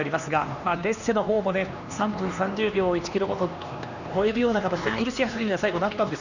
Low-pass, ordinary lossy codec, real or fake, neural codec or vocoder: 7.2 kHz; none; fake; codec, 16 kHz, 2 kbps, X-Codec, HuBERT features, trained on LibriSpeech